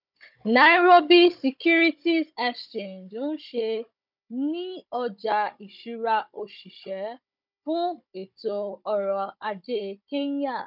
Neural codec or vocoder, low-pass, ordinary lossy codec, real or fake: codec, 16 kHz, 16 kbps, FunCodec, trained on Chinese and English, 50 frames a second; 5.4 kHz; none; fake